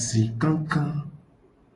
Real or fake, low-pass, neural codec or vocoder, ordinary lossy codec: real; 10.8 kHz; none; AAC, 32 kbps